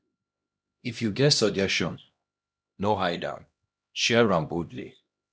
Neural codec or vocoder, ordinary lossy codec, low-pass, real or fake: codec, 16 kHz, 1 kbps, X-Codec, HuBERT features, trained on LibriSpeech; none; none; fake